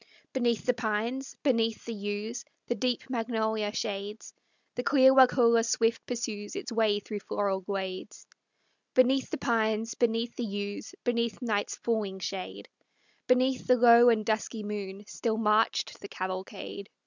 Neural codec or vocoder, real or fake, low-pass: none; real; 7.2 kHz